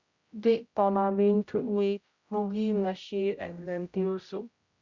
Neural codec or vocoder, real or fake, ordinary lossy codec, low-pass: codec, 16 kHz, 0.5 kbps, X-Codec, HuBERT features, trained on general audio; fake; Opus, 64 kbps; 7.2 kHz